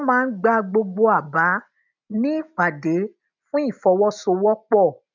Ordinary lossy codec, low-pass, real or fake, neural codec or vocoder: none; 7.2 kHz; real; none